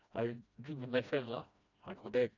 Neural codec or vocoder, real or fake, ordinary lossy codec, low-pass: codec, 16 kHz, 1 kbps, FreqCodec, smaller model; fake; none; 7.2 kHz